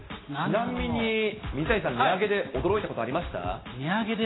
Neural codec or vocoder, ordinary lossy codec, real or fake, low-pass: none; AAC, 16 kbps; real; 7.2 kHz